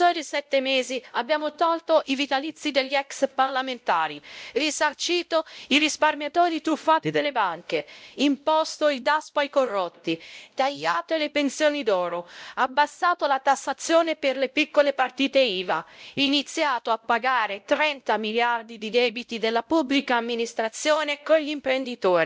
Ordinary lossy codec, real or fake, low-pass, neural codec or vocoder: none; fake; none; codec, 16 kHz, 0.5 kbps, X-Codec, WavLM features, trained on Multilingual LibriSpeech